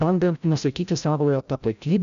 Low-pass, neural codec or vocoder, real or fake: 7.2 kHz; codec, 16 kHz, 0.5 kbps, FreqCodec, larger model; fake